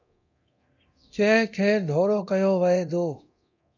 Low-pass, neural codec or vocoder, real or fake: 7.2 kHz; codec, 24 kHz, 0.9 kbps, DualCodec; fake